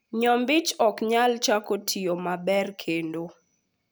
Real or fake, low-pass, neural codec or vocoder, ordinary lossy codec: real; none; none; none